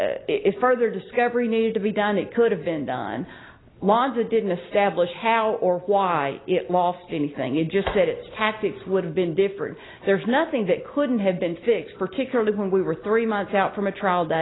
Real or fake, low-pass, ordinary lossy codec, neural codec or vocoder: real; 7.2 kHz; AAC, 16 kbps; none